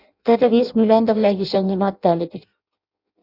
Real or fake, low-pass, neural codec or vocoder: fake; 5.4 kHz; codec, 16 kHz in and 24 kHz out, 0.6 kbps, FireRedTTS-2 codec